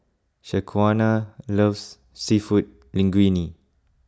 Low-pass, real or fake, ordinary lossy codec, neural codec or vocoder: none; real; none; none